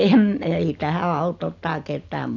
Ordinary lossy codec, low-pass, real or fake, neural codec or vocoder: none; 7.2 kHz; real; none